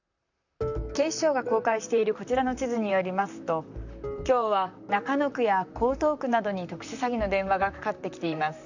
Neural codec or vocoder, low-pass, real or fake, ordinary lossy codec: vocoder, 44.1 kHz, 128 mel bands, Pupu-Vocoder; 7.2 kHz; fake; none